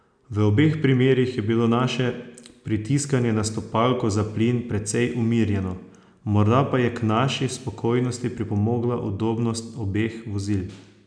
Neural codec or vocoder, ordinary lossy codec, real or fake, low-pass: none; none; real; 9.9 kHz